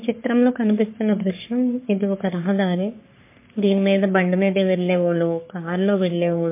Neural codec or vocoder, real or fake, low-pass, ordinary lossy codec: codec, 44.1 kHz, 3.4 kbps, Pupu-Codec; fake; 3.6 kHz; MP3, 24 kbps